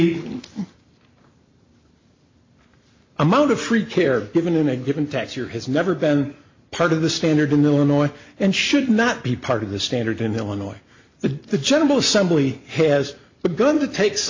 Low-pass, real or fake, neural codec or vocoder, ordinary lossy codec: 7.2 kHz; real; none; MP3, 64 kbps